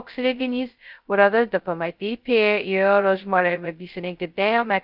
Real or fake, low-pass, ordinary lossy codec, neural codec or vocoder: fake; 5.4 kHz; Opus, 32 kbps; codec, 16 kHz, 0.2 kbps, FocalCodec